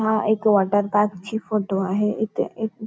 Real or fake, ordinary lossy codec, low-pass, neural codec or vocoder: real; none; none; none